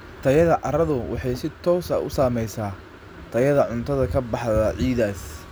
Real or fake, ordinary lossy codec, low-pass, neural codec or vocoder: real; none; none; none